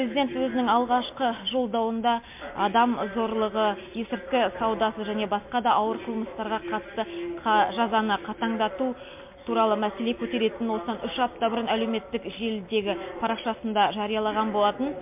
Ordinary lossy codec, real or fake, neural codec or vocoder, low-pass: MP3, 32 kbps; real; none; 3.6 kHz